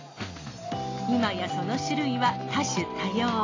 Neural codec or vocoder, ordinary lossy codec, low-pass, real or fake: none; AAC, 32 kbps; 7.2 kHz; real